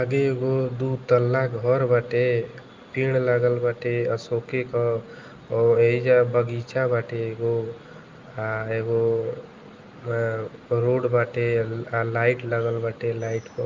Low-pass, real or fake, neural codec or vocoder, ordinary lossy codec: 7.2 kHz; real; none; Opus, 24 kbps